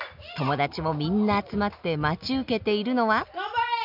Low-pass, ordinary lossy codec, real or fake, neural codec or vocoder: 5.4 kHz; none; real; none